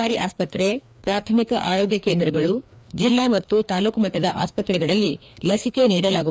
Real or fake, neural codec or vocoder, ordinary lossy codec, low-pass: fake; codec, 16 kHz, 2 kbps, FreqCodec, larger model; none; none